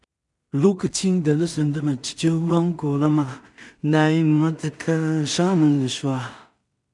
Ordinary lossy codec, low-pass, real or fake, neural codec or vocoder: none; 10.8 kHz; fake; codec, 16 kHz in and 24 kHz out, 0.4 kbps, LongCat-Audio-Codec, two codebook decoder